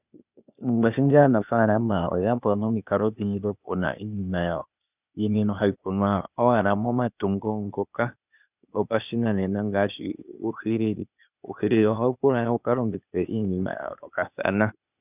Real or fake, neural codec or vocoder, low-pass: fake; codec, 16 kHz, 0.8 kbps, ZipCodec; 3.6 kHz